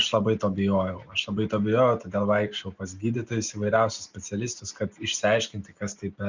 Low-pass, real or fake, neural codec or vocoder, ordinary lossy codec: 7.2 kHz; real; none; Opus, 64 kbps